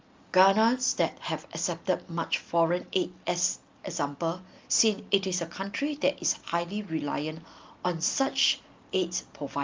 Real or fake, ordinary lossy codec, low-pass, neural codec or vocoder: real; Opus, 32 kbps; 7.2 kHz; none